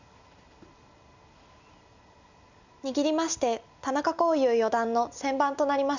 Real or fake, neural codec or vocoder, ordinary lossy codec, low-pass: real; none; none; 7.2 kHz